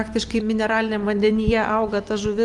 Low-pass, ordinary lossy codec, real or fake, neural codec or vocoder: 10.8 kHz; Opus, 24 kbps; real; none